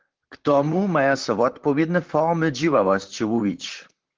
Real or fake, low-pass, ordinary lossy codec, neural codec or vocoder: fake; 7.2 kHz; Opus, 16 kbps; vocoder, 44.1 kHz, 128 mel bands, Pupu-Vocoder